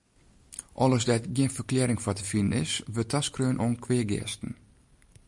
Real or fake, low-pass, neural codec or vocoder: real; 10.8 kHz; none